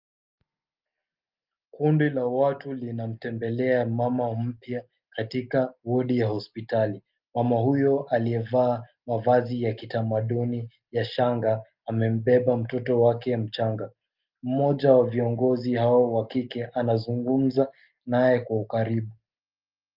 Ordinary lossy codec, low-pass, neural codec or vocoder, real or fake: Opus, 24 kbps; 5.4 kHz; none; real